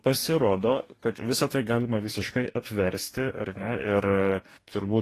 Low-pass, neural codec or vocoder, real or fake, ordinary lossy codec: 14.4 kHz; codec, 44.1 kHz, 2.6 kbps, DAC; fake; AAC, 48 kbps